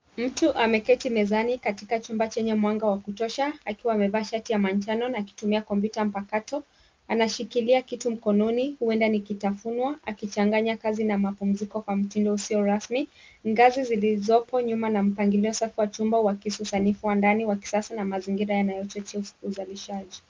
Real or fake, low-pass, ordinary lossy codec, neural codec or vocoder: real; 7.2 kHz; Opus, 24 kbps; none